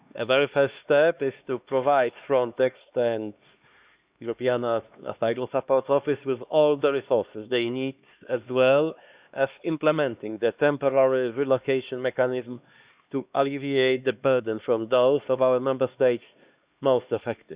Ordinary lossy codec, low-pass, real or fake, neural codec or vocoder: Opus, 64 kbps; 3.6 kHz; fake; codec, 16 kHz, 2 kbps, X-Codec, HuBERT features, trained on LibriSpeech